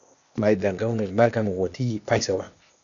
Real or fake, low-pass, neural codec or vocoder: fake; 7.2 kHz; codec, 16 kHz, 0.8 kbps, ZipCodec